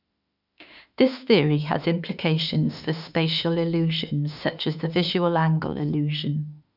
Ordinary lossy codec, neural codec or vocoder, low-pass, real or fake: none; autoencoder, 48 kHz, 32 numbers a frame, DAC-VAE, trained on Japanese speech; 5.4 kHz; fake